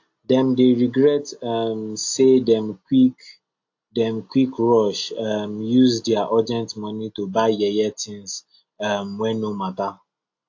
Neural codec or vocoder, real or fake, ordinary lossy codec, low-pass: none; real; AAC, 48 kbps; 7.2 kHz